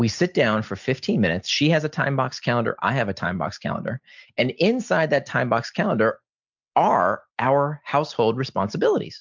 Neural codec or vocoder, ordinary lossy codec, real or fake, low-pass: none; MP3, 64 kbps; real; 7.2 kHz